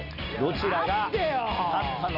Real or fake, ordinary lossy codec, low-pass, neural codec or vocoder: real; none; 5.4 kHz; none